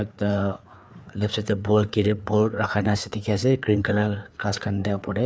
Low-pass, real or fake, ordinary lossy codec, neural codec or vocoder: none; fake; none; codec, 16 kHz, 4 kbps, FreqCodec, larger model